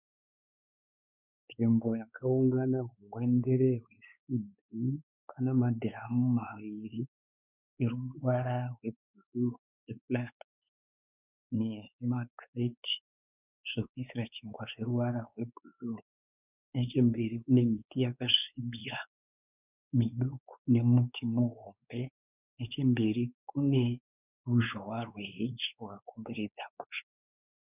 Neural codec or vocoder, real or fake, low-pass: codec, 16 kHz, 4 kbps, X-Codec, WavLM features, trained on Multilingual LibriSpeech; fake; 3.6 kHz